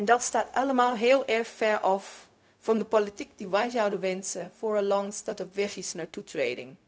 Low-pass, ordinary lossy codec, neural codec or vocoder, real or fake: none; none; codec, 16 kHz, 0.4 kbps, LongCat-Audio-Codec; fake